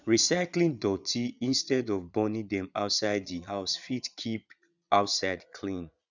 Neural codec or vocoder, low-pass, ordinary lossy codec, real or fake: vocoder, 22.05 kHz, 80 mel bands, Vocos; 7.2 kHz; none; fake